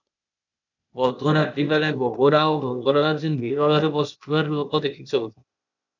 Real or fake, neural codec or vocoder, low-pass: fake; codec, 16 kHz, 0.8 kbps, ZipCodec; 7.2 kHz